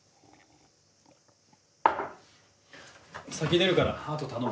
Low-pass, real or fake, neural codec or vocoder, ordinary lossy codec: none; real; none; none